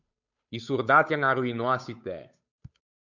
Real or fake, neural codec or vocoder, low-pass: fake; codec, 16 kHz, 8 kbps, FunCodec, trained on Chinese and English, 25 frames a second; 7.2 kHz